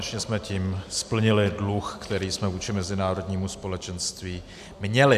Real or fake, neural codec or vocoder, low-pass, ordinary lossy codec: real; none; 14.4 kHz; AAC, 96 kbps